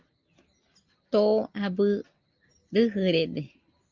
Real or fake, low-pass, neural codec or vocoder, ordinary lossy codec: real; 7.2 kHz; none; Opus, 24 kbps